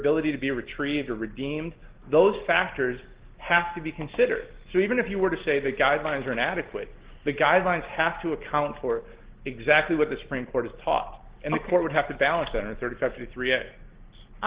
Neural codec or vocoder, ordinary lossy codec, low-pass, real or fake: none; Opus, 16 kbps; 3.6 kHz; real